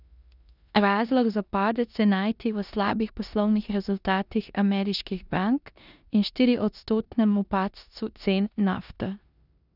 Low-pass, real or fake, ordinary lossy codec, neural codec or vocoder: 5.4 kHz; fake; none; codec, 16 kHz in and 24 kHz out, 0.9 kbps, LongCat-Audio-Codec, four codebook decoder